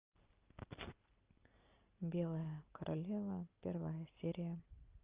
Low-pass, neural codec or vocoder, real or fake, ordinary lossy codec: 3.6 kHz; none; real; Opus, 24 kbps